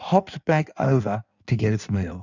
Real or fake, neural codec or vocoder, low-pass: fake; codec, 16 kHz in and 24 kHz out, 1.1 kbps, FireRedTTS-2 codec; 7.2 kHz